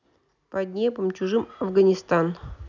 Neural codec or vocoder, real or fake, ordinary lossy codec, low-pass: none; real; none; 7.2 kHz